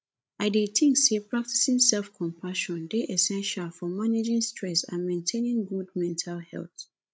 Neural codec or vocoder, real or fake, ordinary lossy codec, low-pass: codec, 16 kHz, 16 kbps, FreqCodec, larger model; fake; none; none